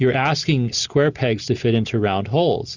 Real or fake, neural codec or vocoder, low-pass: real; none; 7.2 kHz